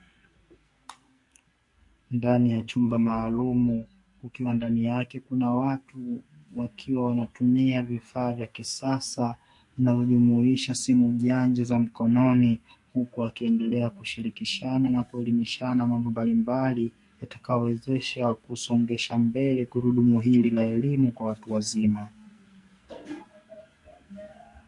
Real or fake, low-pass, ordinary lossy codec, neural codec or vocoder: fake; 10.8 kHz; MP3, 48 kbps; codec, 44.1 kHz, 2.6 kbps, SNAC